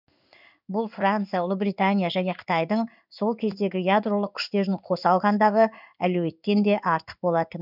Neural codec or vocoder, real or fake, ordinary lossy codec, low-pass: codec, 16 kHz in and 24 kHz out, 1 kbps, XY-Tokenizer; fake; none; 5.4 kHz